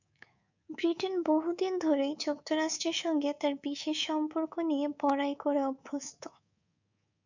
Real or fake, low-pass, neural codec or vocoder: fake; 7.2 kHz; codec, 24 kHz, 3.1 kbps, DualCodec